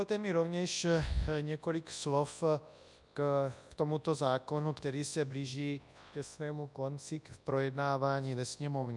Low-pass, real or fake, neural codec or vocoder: 10.8 kHz; fake; codec, 24 kHz, 0.9 kbps, WavTokenizer, large speech release